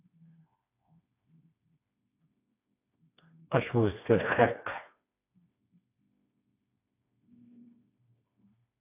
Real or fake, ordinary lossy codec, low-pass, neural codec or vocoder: fake; AAC, 32 kbps; 3.6 kHz; codec, 16 kHz, 2 kbps, FreqCodec, smaller model